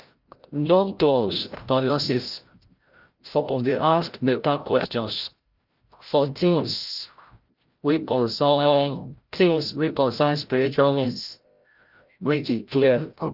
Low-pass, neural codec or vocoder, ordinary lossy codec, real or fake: 5.4 kHz; codec, 16 kHz, 0.5 kbps, FreqCodec, larger model; Opus, 32 kbps; fake